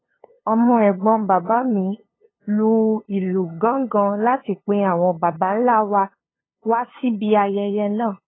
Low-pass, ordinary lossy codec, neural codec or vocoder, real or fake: 7.2 kHz; AAC, 16 kbps; codec, 16 kHz, 2 kbps, FunCodec, trained on LibriTTS, 25 frames a second; fake